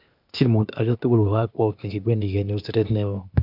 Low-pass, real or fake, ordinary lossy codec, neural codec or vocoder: 5.4 kHz; fake; none; codec, 16 kHz, 0.8 kbps, ZipCodec